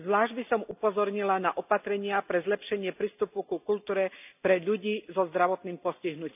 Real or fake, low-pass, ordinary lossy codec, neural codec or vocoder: real; 3.6 kHz; none; none